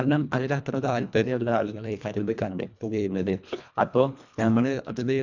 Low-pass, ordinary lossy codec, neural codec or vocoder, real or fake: 7.2 kHz; none; codec, 24 kHz, 1.5 kbps, HILCodec; fake